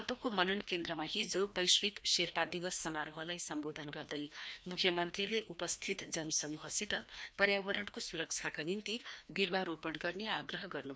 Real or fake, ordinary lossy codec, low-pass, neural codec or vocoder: fake; none; none; codec, 16 kHz, 1 kbps, FreqCodec, larger model